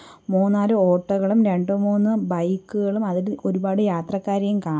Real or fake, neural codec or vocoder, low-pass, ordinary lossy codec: real; none; none; none